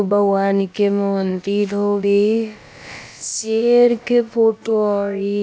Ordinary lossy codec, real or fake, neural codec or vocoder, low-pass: none; fake; codec, 16 kHz, about 1 kbps, DyCAST, with the encoder's durations; none